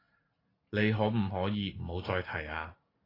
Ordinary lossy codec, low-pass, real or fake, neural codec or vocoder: AAC, 24 kbps; 5.4 kHz; real; none